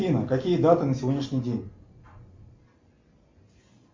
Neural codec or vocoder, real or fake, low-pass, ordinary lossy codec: none; real; 7.2 kHz; MP3, 64 kbps